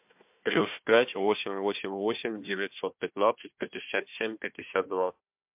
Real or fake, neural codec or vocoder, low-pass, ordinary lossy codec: fake; codec, 16 kHz, 1 kbps, FunCodec, trained on Chinese and English, 50 frames a second; 3.6 kHz; MP3, 32 kbps